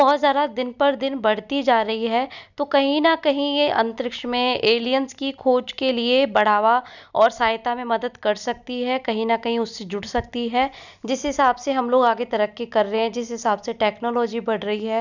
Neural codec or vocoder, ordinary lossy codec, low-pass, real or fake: none; none; 7.2 kHz; real